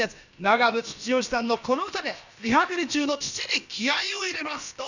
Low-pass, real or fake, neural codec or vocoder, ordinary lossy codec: 7.2 kHz; fake; codec, 16 kHz, about 1 kbps, DyCAST, with the encoder's durations; none